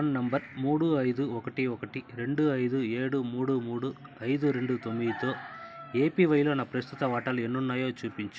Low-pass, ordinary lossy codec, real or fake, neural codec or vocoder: none; none; real; none